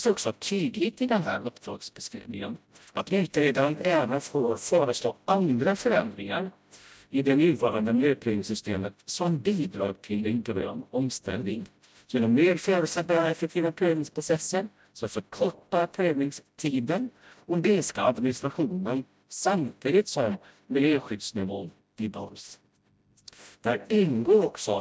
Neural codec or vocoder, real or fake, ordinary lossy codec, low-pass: codec, 16 kHz, 0.5 kbps, FreqCodec, smaller model; fake; none; none